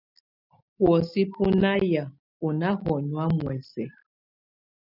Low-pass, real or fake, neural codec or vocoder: 5.4 kHz; real; none